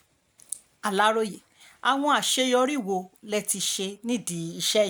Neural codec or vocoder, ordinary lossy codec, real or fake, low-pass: none; none; real; none